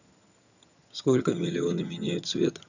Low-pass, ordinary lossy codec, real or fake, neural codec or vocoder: 7.2 kHz; none; fake; vocoder, 22.05 kHz, 80 mel bands, HiFi-GAN